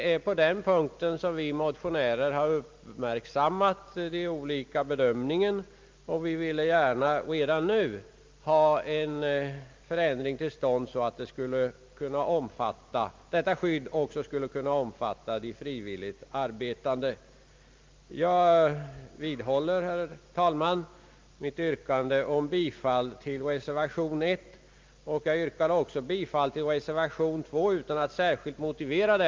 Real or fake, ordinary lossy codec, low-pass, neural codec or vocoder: real; Opus, 24 kbps; 7.2 kHz; none